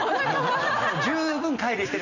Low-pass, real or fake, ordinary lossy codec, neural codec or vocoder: 7.2 kHz; real; none; none